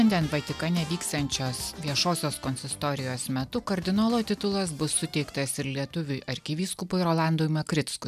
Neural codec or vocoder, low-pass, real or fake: none; 14.4 kHz; real